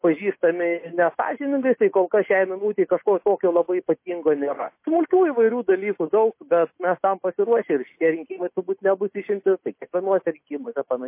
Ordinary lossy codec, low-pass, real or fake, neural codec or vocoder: MP3, 24 kbps; 3.6 kHz; real; none